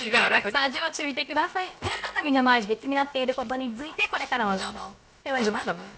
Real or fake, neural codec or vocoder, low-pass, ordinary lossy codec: fake; codec, 16 kHz, about 1 kbps, DyCAST, with the encoder's durations; none; none